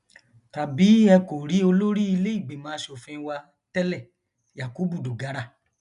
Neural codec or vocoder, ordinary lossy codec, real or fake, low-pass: none; none; real; 10.8 kHz